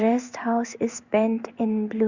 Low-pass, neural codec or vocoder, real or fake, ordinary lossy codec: 7.2 kHz; codec, 16 kHz in and 24 kHz out, 1 kbps, XY-Tokenizer; fake; Opus, 64 kbps